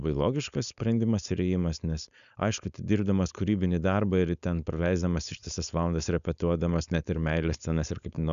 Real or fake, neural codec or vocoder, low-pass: fake; codec, 16 kHz, 4.8 kbps, FACodec; 7.2 kHz